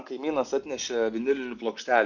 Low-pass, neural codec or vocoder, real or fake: 7.2 kHz; none; real